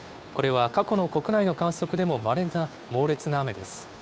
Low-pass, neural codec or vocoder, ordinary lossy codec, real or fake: none; codec, 16 kHz, 2 kbps, FunCodec, trained on Chinese and English, 25 frames a second; none; fake